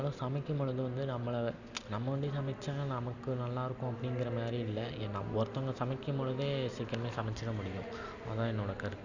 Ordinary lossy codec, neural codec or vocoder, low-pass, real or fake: none; none; 7.2 kHz; real